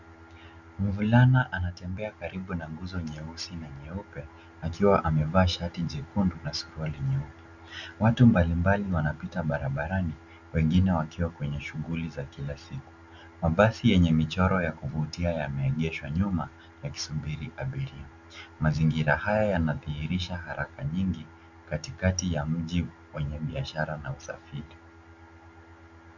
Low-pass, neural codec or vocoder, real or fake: 7.2 kHz; none; real